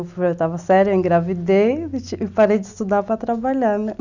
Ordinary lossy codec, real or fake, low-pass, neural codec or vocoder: none; real; 7.2 kHz; none